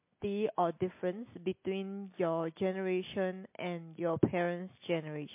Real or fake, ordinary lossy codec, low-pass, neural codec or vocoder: real; MP3, 24 kbps; 3.6 kHz; none